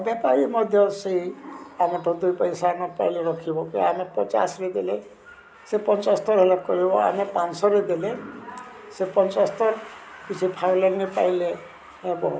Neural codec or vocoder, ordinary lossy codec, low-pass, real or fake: none; none; none; real